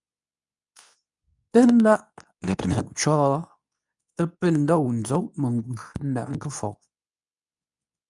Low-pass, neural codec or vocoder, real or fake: 10.8 kHz; codec, 24 kHz, 0.9 kbps, WavTokenizer, medium speech release version 2; fake